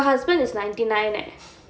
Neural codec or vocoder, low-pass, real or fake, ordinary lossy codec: none; none; real; none